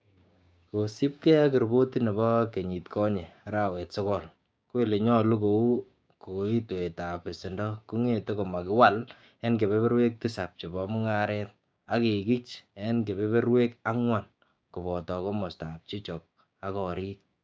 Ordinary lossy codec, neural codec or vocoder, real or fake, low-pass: none; codec, 16 kHz, 6 kbps, DAC; fake; none